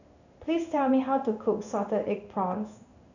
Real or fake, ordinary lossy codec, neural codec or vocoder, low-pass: fake; none; codec, 16 kHz in and 24 kHz out, 1 kbps, XY-Tokenizer; 7.2 kHz